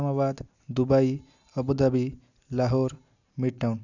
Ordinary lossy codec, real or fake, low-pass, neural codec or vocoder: none; real; 7.2 kHz; none